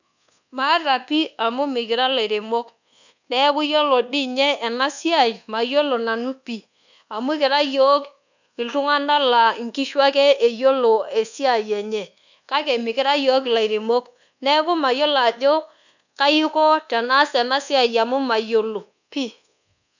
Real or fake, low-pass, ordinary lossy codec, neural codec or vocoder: fake; 7.2 kHz; none; codec, 24 kHz, 1.2 kbps, DualCodec